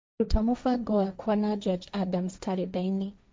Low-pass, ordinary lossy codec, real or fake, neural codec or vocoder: none; none; fake; codec, 16 kHz, 1.1 kbps, Voila-Tokenizer